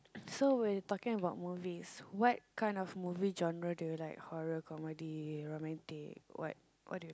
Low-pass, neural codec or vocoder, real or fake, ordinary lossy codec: none; none; real; none